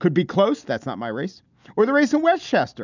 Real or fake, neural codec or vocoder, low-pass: real; none; 7.2 kHz